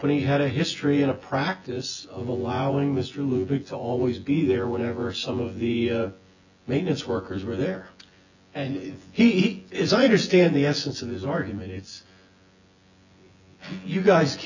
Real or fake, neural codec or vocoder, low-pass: fake; vocoder, 24 kHz, 100 mel bands, Vocos; 7.2 kHz